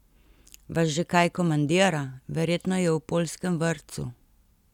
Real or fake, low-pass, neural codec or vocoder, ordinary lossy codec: real; 19.8 kHz; none; none